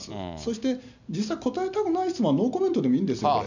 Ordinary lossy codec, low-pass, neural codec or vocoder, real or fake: none; 7.2 kHz; none; real